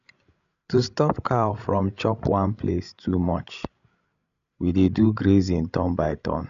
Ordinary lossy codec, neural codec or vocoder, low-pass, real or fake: MP3, 96 kbps; codec, 16 kHz, 16 kbps, FreqCodec, larger model; 7.2 kHz; fake